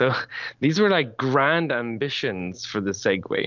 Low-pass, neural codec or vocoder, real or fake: 7.2 kHz; none; real